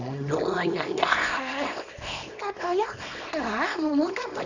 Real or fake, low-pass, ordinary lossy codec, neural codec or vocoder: fake; 7.2 kHz; none; codec, 24 kHz, 0.9 kbps, WavTokenizer, small release